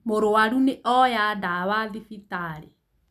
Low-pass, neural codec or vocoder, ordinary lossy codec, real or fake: 14.4 kHz; none; none; real